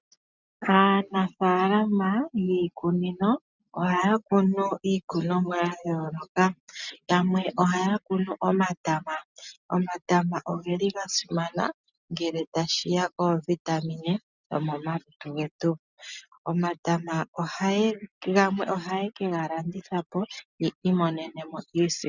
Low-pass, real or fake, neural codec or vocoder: 7.2 kHz; real; none